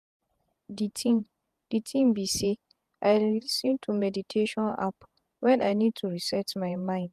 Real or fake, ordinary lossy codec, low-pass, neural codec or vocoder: fake; AAC, 96 kbps; 14.4 kHz; vocoder, 48 kHz, 128 mel bands, Vocos